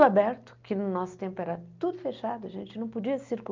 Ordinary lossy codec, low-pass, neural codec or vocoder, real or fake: Opus, 24 kbps; 7.2 kHz; none; real